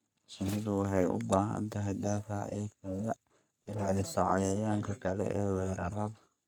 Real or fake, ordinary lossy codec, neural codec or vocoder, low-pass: fake; none; codec, 44.1 kHz, 3.4 kbps, Pupu-Codec; none